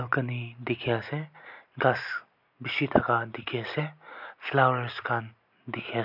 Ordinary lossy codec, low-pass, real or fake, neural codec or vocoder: none; 5.4 kHz; real; none